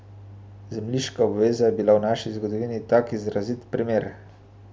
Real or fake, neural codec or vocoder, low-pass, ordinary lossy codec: real; none; none; none